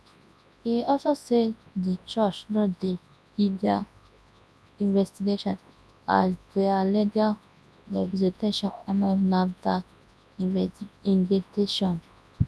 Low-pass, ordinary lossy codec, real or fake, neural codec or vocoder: none; none; fake; codec, 24 kHz, 0.9 kbps, WavTokenizer, large speech release